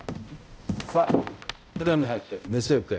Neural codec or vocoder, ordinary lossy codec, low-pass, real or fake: codec, 16 kHz, 0.5 kbps, X-Codec, HuBERT features, trained on balanced general audio; none; none; fake